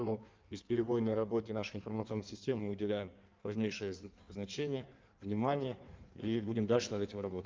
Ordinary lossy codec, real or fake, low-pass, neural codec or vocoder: Opus, 24 kbps; fake; 7.2 kHz; codec, 16 kHz in and 24 kHz out, 1.1 kbps, FireRedTTS-2 codec